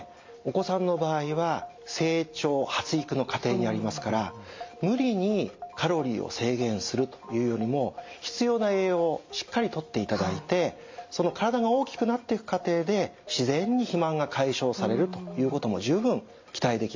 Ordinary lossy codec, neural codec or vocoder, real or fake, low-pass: MP3, 48 kbps; none; real; 7.2 kHz